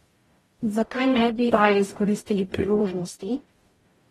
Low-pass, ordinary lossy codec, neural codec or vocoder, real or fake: 19.8 kHz; AAC, 32 kbps; codec, 44.1 kHz, 0.9 kbps, DAC; fake